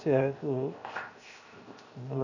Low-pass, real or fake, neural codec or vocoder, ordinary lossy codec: 7.2 kHz; fake; codec, 16 kHz, 0.7 kbps, FocalCodec; none